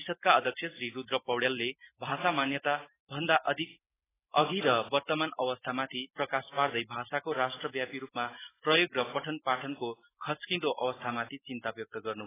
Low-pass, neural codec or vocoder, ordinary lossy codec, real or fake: 3.6 kHz; none; AAC, 16 kbps; real